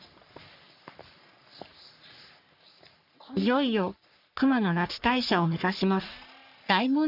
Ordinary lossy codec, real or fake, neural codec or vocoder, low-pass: none; fake; codec, 44.1 kHz, 3.4 kbps, Pupu-Codec; 5.4 kHz